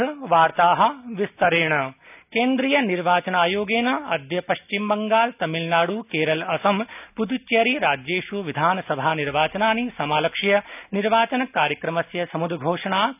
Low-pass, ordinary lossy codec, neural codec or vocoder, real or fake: 3.6 kHz; none; none; real